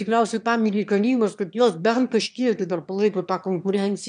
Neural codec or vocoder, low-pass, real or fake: autoencoder, 22.05 kHz, a latent of 192 numbers a frame, VITS, trained on one speaker; 9.9 kHz; fake